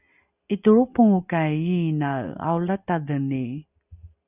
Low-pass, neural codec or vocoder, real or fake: 3.6 kHz; none; real